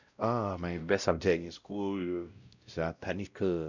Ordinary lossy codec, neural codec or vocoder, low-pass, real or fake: none; codec, 16 kHz, 0.5 kbps, X-Codec, WavLM features, trained on Multilingual LibriSpeech; 7.2 kHz; fake